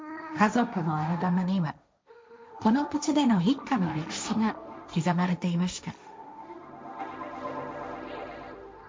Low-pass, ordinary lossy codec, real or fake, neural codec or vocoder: none; none; fake; codec, 16 kHz, 1.1 kbps, Voila-Tokenizer